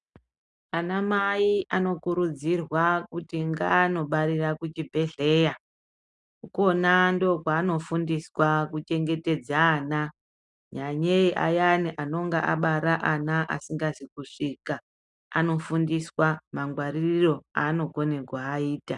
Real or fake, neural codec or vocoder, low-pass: real; none; 10.8 kHz